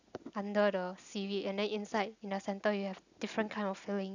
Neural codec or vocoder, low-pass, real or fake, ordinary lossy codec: none; 7.2 kHz; real; none